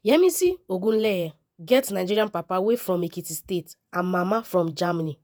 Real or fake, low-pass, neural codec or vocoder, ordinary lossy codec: fake; none; vocoder, 48 kHz, 128 mel bands, Vocos; none